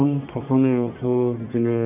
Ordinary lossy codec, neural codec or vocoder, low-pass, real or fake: none; codec, 44.1 kHz, 1.7 kbps, Pupu-Codec; 3.6 kHz; fake